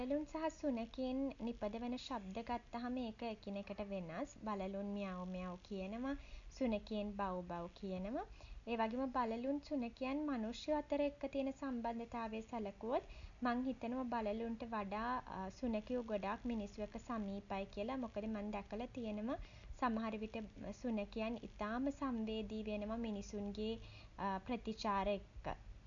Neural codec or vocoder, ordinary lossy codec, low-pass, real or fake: none; none; 7.2 kHz; real